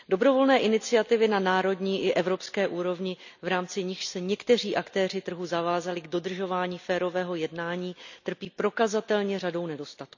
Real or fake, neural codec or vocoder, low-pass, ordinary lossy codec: real; none; 7.2 kHz; none